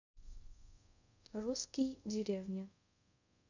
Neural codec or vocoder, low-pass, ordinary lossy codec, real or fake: codec, 24 kHz, 0.5 kbps, DualCodec; 7.2 kHz; none; fake